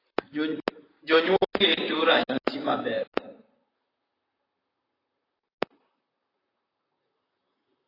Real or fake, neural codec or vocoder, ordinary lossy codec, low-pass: fake; vocoder, 44.1 kHz, 128 mel bands, Pupu-Vocoder; AAC, 24 kbps; 5.4 kHz